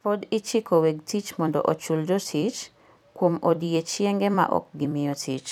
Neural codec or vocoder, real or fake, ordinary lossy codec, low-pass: vocoder, 44.1 kHz, 128 mel bands every 256 samples, BigVGAN v2; fake; none; 19.8 kHz